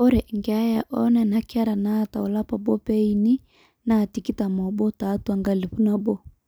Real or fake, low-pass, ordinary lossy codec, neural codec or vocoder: real; none; none; none